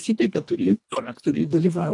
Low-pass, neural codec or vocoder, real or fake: 10.8 kHz; codec, 24 kHz, 1.5 kbps, HILCodec; fake